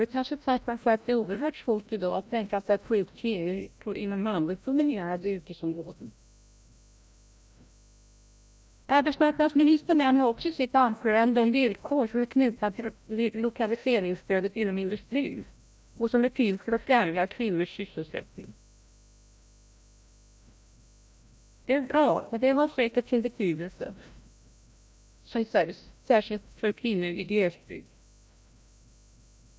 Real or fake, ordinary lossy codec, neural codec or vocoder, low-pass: fake; none; codec, 16 kHz, 0.5 kbps, FreqCodec, larger model; none